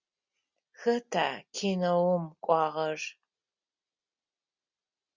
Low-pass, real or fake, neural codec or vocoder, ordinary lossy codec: 7.2 kHz; real; none; Opus, 64 kbps